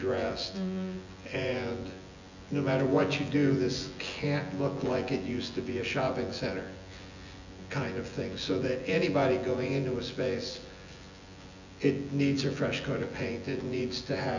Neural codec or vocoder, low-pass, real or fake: vocoder, 24 kHz, 100 mel bands, Vocos; 7.2 kHz; fake